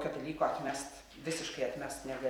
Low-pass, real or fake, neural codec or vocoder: 19.8 kHz; fake; vocoder, 44.1 kHz, 128 mel bands every 256 samples, BigVGAN v2